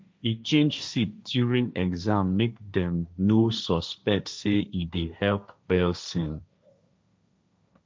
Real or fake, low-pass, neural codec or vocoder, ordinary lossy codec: fake; none; codec, 16 kHz, 1.1 kbps, Voila-Tokenizer; none